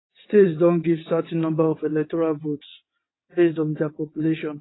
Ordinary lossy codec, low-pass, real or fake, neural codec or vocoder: AAC, 16 kbps; 7.2 kHz; fake; vocoder, 22.05 kHz, 80 mel bands, WaveNeXt